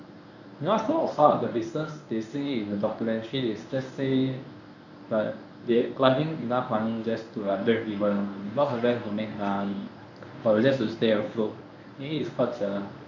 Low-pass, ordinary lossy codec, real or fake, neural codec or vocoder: 7.2 kHz; none; fake; codec, 24 kHz, 0.9 kbps, WavTokenizer, medium speech release version 1